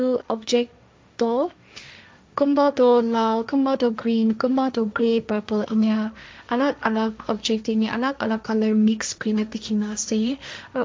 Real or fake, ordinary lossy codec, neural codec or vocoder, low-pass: fake; none; codec, 16 kHz, 1.1 kbps, Voila-Tokenizer; none